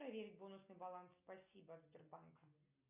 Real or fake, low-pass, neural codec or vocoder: real; 3.6 kHz; none